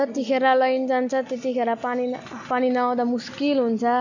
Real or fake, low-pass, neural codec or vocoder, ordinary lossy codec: real; 7.2 kHz; none; none